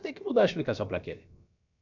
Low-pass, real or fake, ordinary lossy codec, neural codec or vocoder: 7.2 kHz; fake; none; codec, 16 kHz, about 1 kbps, DyCAST, with the encoder's durations